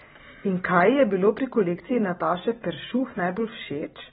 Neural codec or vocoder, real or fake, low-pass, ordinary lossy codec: none; real; 19.8 kHz; AAC, 16 kbps